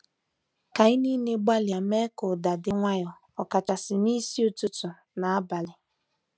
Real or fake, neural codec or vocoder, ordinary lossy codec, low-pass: real; none; none; none